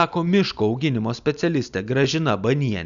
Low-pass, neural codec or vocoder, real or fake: 7.2 kHz; none; real